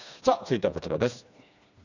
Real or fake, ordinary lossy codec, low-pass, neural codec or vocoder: fake; none; 7.2 kHz; codec, 16 kHz, 2 kbps, FreqCodec, smaller model